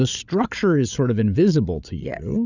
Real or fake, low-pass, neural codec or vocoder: fake; 7.2 kHz; codec, 16 kHz, 8 kbps, FreqCodec, larger model